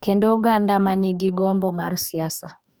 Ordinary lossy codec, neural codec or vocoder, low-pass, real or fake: none; codec, 44.1 kHz, 2.6 kbps, DAC; none; fake